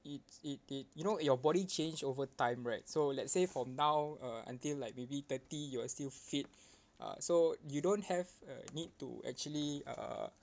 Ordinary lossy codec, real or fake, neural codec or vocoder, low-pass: none; real; none; none